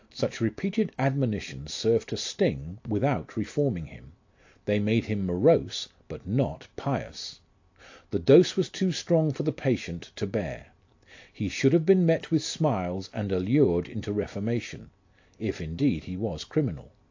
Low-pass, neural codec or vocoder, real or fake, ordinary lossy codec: 7.2 kHz; none; real; AAC, 48 kbps